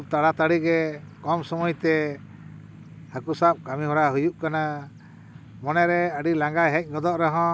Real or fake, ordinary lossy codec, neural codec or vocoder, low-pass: real; none; none; none